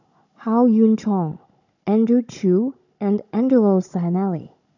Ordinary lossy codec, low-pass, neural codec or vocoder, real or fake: none; 7.2 kHz; codec, 16 kHz, 16 kbps, FunCodec, trained on Chinese and English, 50 frames a second; fake